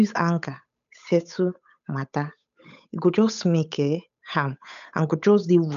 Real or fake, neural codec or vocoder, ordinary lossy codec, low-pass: fake; codec, 16 kHz, 8 kbps, FunCodec, trained on Chinese and English, 25 frames a second; none; 7.2 kHz